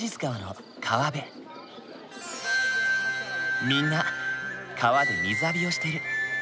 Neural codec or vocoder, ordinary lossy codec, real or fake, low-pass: none; none; real; none